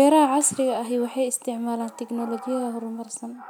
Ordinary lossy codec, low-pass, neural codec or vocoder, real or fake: none; none; none; real